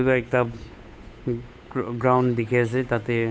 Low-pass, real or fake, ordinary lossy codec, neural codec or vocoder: none; fake; none; codec, 16 kHz, 8 kbps, FunCodec, trained on Chinese and English, 25 frames a second